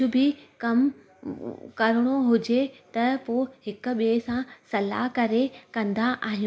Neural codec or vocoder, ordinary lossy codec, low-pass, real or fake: none; none; none; real